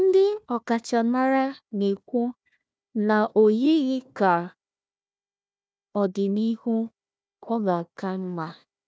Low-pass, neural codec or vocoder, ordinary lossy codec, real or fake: none; codec, 16 kHz, 1 kbps, FunCodec, trained on Chinese and English, 50 frames a second; none; fake